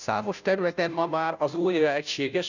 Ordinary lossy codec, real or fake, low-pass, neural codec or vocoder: none; fake; 7.2 kHz; codec, 16 kHz, 0.5 kbps, FunCodec, trained on Chinese and English, 25 frames a second